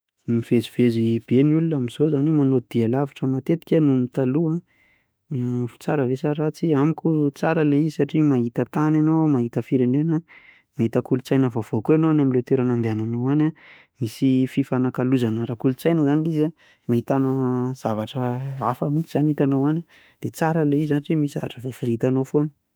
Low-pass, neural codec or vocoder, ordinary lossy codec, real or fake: none; autoencoder, 48 kHz, 32 numbers a frame, DAC-VAE, trained on Japanese speech; none; fake